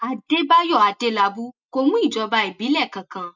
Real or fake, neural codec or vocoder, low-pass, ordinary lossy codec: real; none; 7.2 kHz; none